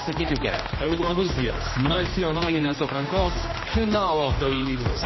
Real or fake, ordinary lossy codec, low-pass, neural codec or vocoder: fake; MP3, 24 kbps; 7.2 kHz; codec, 16 kHz, 1 kbps, X-Codec, HuBERT features, trained on general audio